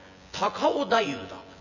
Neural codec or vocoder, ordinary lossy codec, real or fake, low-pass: vocoder, 24 kHz, 100 mel bands, Vocos; none; fake; 7.2 kHz